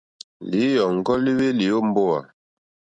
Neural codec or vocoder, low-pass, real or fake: none; 9.9 kHz; real